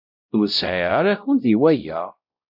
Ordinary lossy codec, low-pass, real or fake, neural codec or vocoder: MP3, 48 kbps; 5.4 kHz; fake; codec, 16 kHz, 0.5 kbps, X-Codec, WavLM features, trained on Multilingual LibriSpeech